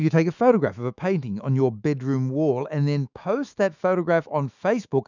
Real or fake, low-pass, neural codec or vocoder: fake; 7.2 kHz; autoencoder, 48 kHz, 128 numbers a frame, DAC-VAE, trained on Japanese speech